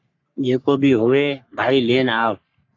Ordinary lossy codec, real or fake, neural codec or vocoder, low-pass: AAC, 48 kbps; fake; codec, 44.1 kHz, 3.4 kbps, Pupu-Codec; 7.2 kHz